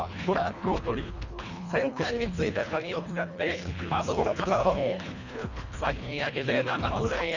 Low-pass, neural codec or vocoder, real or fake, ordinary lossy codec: 7.2 kHz; codec, 24 kHz, 1.5 kbps, HILCodec; fake; none